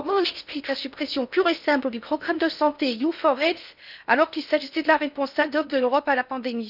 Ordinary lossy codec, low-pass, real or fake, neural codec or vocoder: none; 5.4 kHz; fake; codec, 16 kHz in and 24 kHz out, 0.6 kbps, FocalCodec, streaming, 4096 codes